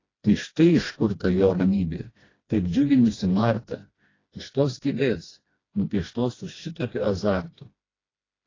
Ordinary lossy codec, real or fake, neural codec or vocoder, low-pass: AAC, 32 kbps; fake; codec, 16 kHz, 1 kbps, FreqCodec, smaller model; 7.2 kHz